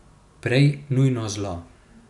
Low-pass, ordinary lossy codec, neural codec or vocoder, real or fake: 10.8 kHz; none; none; real